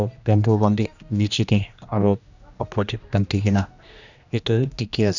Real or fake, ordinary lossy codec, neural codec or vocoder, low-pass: fake; none; codec, 16 kHz, 1 kbps, X-Codec, HuBERT features, trained on general audio; 7.2 kHz